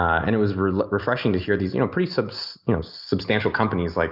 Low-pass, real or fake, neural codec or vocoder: 5.4 kHz; real; none